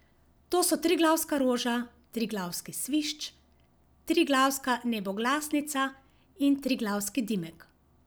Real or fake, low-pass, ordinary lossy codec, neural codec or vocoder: real; none; none; none